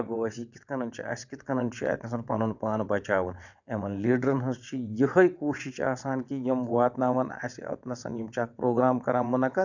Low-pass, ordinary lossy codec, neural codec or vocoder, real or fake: 7.2 kHz; none; vocoder, 22.05 kHz, 80 mel bands, WaveNeXt; fake